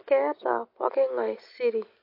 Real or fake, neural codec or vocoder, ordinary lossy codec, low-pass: real; none; MP3, 32 kbps; 5.4 kHz